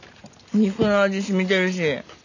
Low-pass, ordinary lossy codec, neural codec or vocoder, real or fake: 7.2 kHz; none; none; real